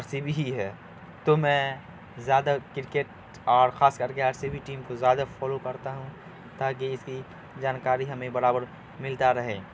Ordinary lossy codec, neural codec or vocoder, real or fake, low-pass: none; none; real; none